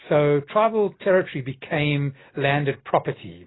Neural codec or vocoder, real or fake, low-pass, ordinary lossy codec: none; real; 7.2 kHz; AAC, 16 kbps